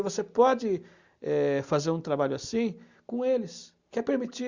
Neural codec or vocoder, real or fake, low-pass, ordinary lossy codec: none; real; 7.2 kHz; Opus, 64 kbps